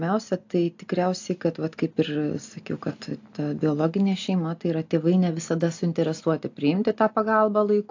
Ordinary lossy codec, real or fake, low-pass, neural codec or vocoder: AAC, 48 kbps; real; 7.2 kHz; none